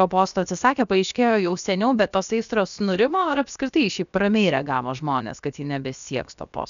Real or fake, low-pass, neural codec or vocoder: fake; 7.2 kHz; codec, 16 kHz, about 1 kbps, DyCAST, with the encoder's durations